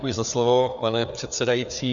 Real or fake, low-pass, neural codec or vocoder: fake; 7.2 kHz; codec, 16 kHz, 4 kbps, FreqCodec, larger model